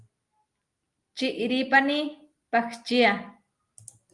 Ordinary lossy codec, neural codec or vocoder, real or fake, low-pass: Opus, 32 kbps; none; real; 10.8 kHz